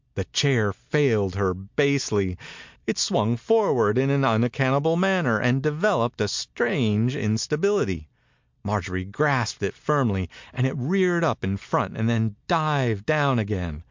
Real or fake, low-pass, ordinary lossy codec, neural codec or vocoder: real; 7.2 kHz; MP3, 64 kbps; none